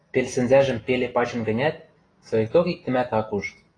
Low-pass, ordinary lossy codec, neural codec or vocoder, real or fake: 9.9 kHz; AAC, 32 kbps; none; real